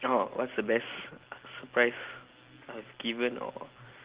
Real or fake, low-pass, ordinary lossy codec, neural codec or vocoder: real; 3.6 kHz; Opus, 16 kbps; none